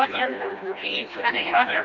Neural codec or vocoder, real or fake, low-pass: codec, 16 kHz, 1 kbps, FreqCodec, smaller model; fake; 7.2 kHz